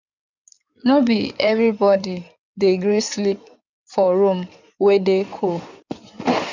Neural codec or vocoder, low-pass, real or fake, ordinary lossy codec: codec, 16 kHz in and 24 kHz out, 2.2 kbps, FireRedTTS-2 codec; 7.2 kHz; fake; none